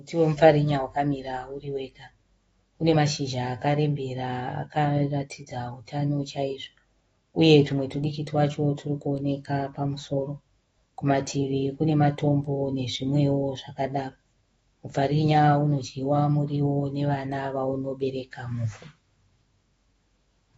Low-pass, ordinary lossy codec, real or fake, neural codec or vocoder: 19.8 kHz; AAC, 24 kbps; fake; autoencoder, 48 kHz, 128 numbers a frame, DAC-VAE, trained on Japanese speech